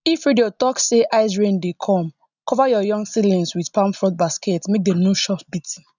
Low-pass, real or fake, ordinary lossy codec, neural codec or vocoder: 7.2 kHz; real; none; none